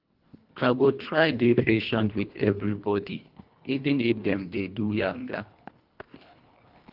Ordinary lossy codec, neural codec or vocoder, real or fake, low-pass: Opus, 24 kbps; codec, 24 kHz, 1.5 kbps, HILCodec; fake; 5.4 kHz